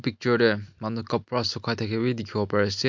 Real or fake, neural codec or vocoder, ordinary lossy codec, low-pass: real; none; MP3, 64 kbps; 7.2 kHz